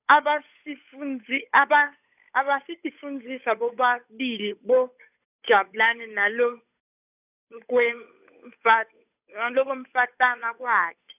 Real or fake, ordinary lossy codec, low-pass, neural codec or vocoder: fake; none; 3.6 kHz; codec, 16 kHz, 8 kbps, FunCodec, trained on Chinese and English, 25 frames a second